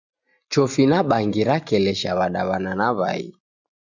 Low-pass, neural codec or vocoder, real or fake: 7.2 kHz; none; real